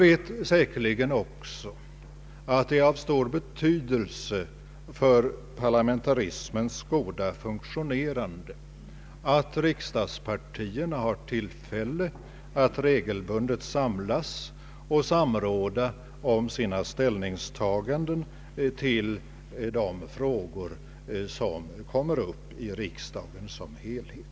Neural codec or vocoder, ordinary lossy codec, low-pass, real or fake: none; none; none; real